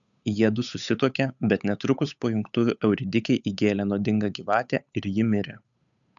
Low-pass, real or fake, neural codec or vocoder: 7.2 kHz; fake; codec, 16 kHz, 8 kbps, FunCodec, trained on Chinese and English, 25 frames a second